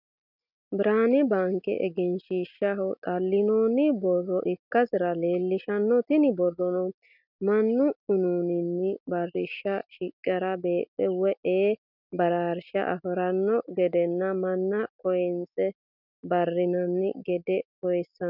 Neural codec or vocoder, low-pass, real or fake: none; 5.4 kHz; real